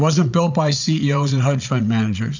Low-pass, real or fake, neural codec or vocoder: 7.2 kHz; fake; vocoder, 22.05 kHz, 80 mel bands, Vocos